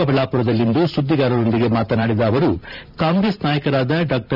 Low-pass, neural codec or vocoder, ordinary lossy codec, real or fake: 5.4 kHz; vocoder, 44.1 kHz, 128 mel bands every 512 samples, BigVGAN v2; none; fake